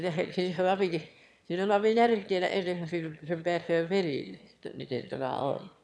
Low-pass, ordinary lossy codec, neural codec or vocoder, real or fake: none; none; autoencoder, 22.05 kHz, a latent of 192 numbers a frame, VITS, trained on one speaker; fake